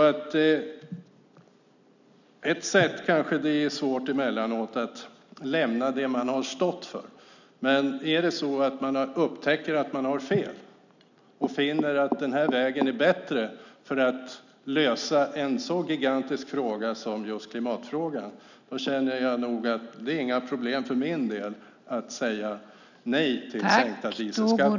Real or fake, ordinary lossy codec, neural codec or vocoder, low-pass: real; none; none; 7.2 kHz